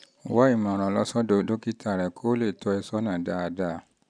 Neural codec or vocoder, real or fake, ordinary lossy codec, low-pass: none; real; none; 9.9 kHz